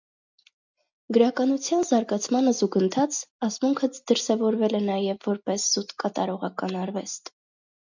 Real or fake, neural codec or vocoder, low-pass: real; none; 7.2 kHz